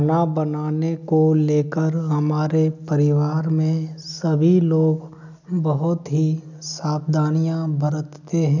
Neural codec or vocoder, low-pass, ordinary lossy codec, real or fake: none; 7.2 kHz; none; real